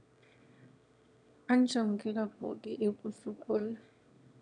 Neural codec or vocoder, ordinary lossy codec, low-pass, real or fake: autoencoder, 22.05 kHz, a latent of 192 numbers a frame, VITS, trained on one speaker; none; 9.9 kHz; fake